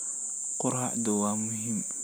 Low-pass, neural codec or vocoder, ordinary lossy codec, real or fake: none; none; none; real